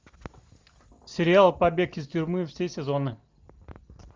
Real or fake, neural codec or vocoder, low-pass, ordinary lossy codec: real; none; 7.2 kHz; Opus, 32 kbps